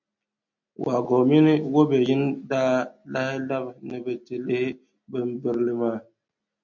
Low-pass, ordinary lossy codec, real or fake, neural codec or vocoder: 7.2 kHz; MP3, 48 kbps; real; none